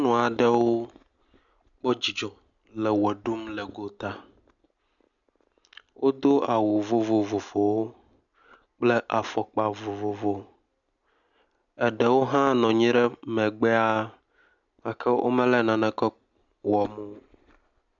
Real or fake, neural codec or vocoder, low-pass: real; none; 7.2 kHz